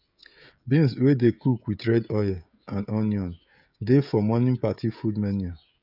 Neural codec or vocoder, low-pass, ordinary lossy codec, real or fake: codec, 16 kHz, 16 kbps, FreqCodec, smaller model; 5.4 kHz; none; fake